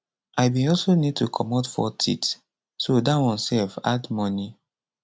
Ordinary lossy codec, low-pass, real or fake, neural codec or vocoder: none; none; real; none